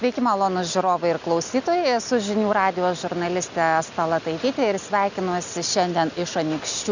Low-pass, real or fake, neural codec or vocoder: 7.2 kHz; real; none